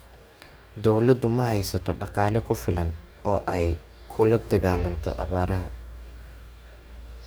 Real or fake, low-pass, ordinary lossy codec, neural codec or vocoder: fake; none; none; codec, 44.1 kHz, 2.6 kbps, DAC